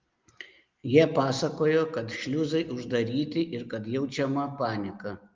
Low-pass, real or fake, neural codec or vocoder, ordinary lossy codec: 7.2 kHz; real; none; Opus, 32 kbps